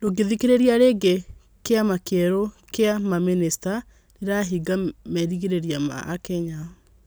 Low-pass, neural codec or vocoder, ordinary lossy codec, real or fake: none; none; none; real